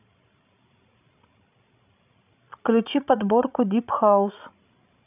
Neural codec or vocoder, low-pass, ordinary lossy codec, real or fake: codec, 16 kHz, 16 kbps, FreqCodec, larger model; 3.6 kHz; none; fake